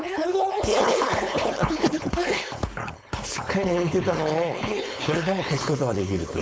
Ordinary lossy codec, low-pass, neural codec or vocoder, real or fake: none; none; codec, 16 kHz, 4.8 kbps, FACodec; fake